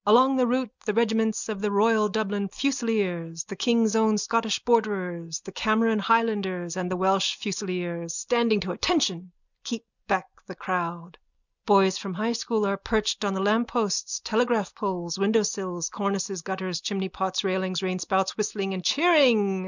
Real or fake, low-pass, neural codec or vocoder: real; 7.2 kHz; none